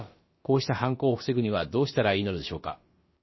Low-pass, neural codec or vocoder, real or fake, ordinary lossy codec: 7.2 kHz; codec, 16 kHz, about 1 kbps, DyCAST, with the encoder's durations; fake; MP3, 24 kbps